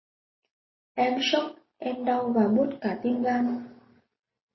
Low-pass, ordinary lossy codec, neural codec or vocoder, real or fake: 7.2 kHz; MP3, 24 kbps; none; real